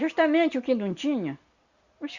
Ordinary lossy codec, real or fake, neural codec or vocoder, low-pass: Opus, 64 kbps; real; none; 7.2 kHz